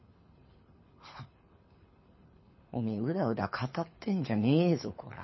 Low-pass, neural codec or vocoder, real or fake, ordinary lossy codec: 7.2 kHz; codec, 24 kHz, 6 kbps, HILCodec; fake; MP3, 24 kbps